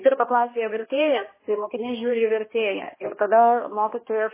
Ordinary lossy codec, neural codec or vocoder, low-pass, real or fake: MP3, 16 kbps; codec, 16 kHz, 1 kbps, X-Codec, HuBERT features, trained on balanced general audio; 3.6 kHz; fake